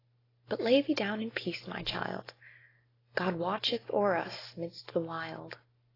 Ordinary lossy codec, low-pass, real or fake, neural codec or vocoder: AAC, 24 kbps; 5.4 kHz; real; none